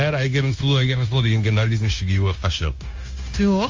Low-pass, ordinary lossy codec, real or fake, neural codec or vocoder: 7.2 kHz; Opus, 32 kbps; fake; codec, 16 kHz, 0.9 kbps, LongCat-Audio-Codec